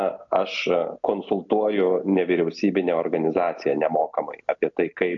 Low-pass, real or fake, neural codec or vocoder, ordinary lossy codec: 7.2 kHz; real; none; AAC, 64 kbps